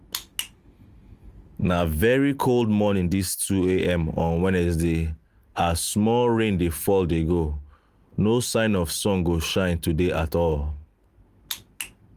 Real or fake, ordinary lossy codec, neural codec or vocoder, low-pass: real; Opus, 32 kbps; none; 14.4 kHz